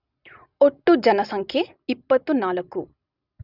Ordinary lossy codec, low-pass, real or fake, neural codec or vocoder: none; 5.4 kHz; real; none